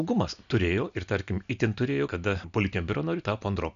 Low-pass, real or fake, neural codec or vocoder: 7.2 kHz; real; none